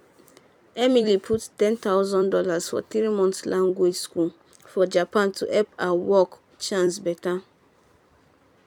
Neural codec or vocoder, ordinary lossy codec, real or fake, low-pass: vocoder, 44.1 kHz, 128 mel bands every 256 samples, BigVGAN v2; none; fake; 19.8 kHz